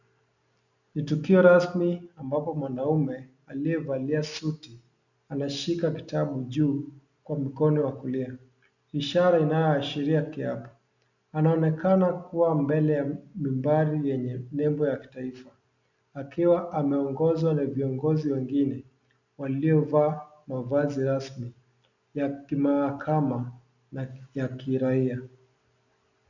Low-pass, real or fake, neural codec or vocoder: 7.2 kHz; real; none